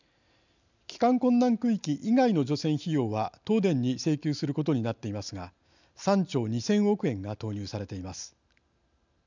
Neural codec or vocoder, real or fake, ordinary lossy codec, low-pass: none; real; none; 7.2 kHz